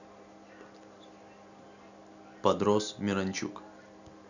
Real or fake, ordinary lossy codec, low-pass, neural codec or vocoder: real; none; 7.2 kHz; none